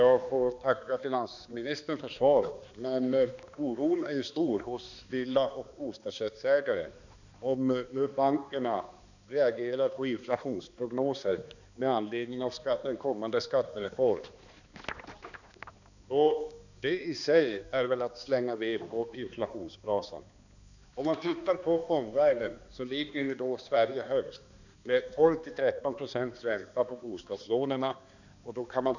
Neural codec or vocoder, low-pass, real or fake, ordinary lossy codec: codec, 16 kHz, 2 kbps, X-Codec, HuBERT features, trained on balanced general audio; 7.2 kHz; fake; none